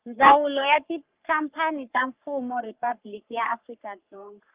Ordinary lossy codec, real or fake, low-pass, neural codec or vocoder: Opus, 32 kbps; fake; 3.6 kHz; codec, 44.1 kHz, 3.4 kbps, Pupu-Codec